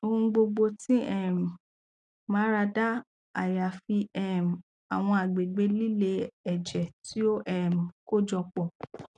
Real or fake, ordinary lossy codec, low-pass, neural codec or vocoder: real; none; 9.9 kHz; none